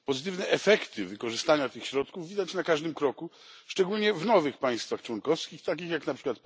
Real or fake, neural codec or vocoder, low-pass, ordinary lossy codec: real; none; none; none